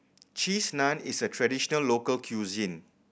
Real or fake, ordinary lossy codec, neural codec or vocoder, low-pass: real; none; none; none